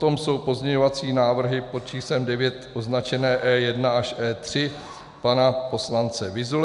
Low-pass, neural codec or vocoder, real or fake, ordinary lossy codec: 10.8 kHz; none; real; MP3, 96 kbps